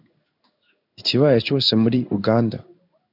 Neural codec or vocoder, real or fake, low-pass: codec, 16 kHz in and 24 kHz out, 1 kbps, XY-Tokenizer; fake; 5.4 kHz